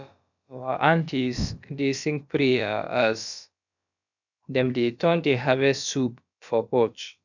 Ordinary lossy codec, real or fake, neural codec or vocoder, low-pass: none; fake; codec, 16 kHz, about 1 kbps, DyCAST, with the encoder's durations; 7.2 kHz